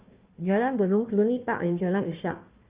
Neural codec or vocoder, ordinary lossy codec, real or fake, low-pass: codec, 16 kHz, 1 kbps, FunCodec, trained on Chinese and English, 50 frames a second; Opus, 32 kbps; fake; 3.6 kHz